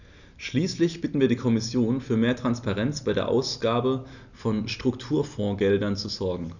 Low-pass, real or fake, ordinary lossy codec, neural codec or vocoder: 7.2 kHz; real; none; none